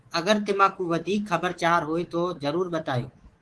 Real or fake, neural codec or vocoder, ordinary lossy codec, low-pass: fake; codec, 24 kHz, 3.1 kbps, DualCodec; Opus, 16 kbps; 10.8 kHz